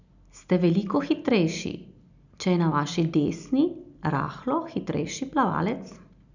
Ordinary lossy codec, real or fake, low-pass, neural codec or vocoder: none; real; 7.2 kHz; none